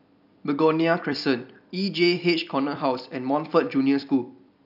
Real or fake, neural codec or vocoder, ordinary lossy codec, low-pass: fake; vocoder, 44.1 kHz, 128 mel bands every 256 samples, BigVGAN v2; none; 5.4 kHz